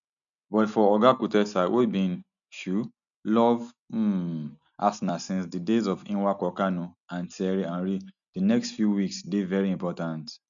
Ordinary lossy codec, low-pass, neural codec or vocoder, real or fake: none; 7.2 kHz; none; real